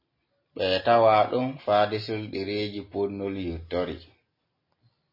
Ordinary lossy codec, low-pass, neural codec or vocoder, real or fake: MP3, 24 kbps; 5.4 kHz; none; real